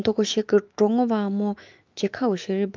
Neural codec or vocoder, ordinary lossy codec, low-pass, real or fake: autoencoder, 48 kHz, 128 numbers a frame, DAC-VAE, trained on Japanese speech; Opus, 24 kbps; 7.2 kHz; fake